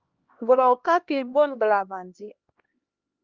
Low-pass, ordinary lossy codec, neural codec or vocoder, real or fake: 7.2 kHz; Opus, 24 kbps; codec, 16 kHz, 1 kbps, X-Codec, HuBERT features, trained on LibriSpeech; fake